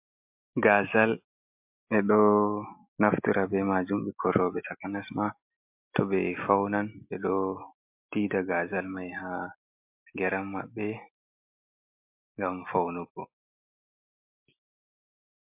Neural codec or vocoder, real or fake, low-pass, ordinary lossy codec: none; real; 3.6 kHz; MP3, 32 kbps